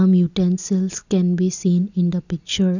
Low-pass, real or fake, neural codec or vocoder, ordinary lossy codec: 7.2 kHz; real; none; none